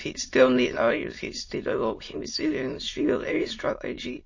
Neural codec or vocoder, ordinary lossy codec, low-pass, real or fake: autoencoder, 22.05 kHz, a latent of 192 numbers a frame, VITS, trained on many speakers; MP3, 32 kbps; 7.2 kHz; fake